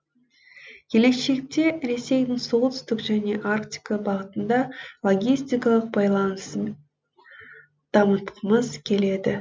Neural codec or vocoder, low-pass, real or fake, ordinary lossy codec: none; none; real; none